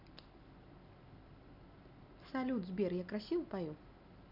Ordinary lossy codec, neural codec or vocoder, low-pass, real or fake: none; none; 5.4 kHz; real